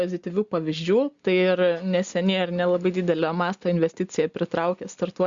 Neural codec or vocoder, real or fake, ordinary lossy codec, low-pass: none; real; Opus, 64 kbps; 7.2 kHz